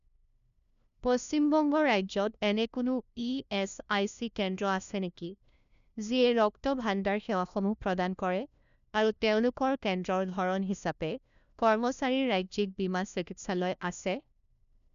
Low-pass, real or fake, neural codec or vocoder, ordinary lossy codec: 7.2 kHz; fake; codec, 16 kHz, 1 kbps, FunCodec, trained on LibriTTS, 50 frames a second; none